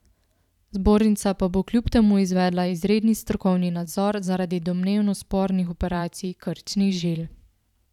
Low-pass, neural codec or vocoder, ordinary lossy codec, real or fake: 19.8 kHz; vocoder, 44.1 kHz, 128 mel bands every 512 samples, BigVGAN v2; none; fake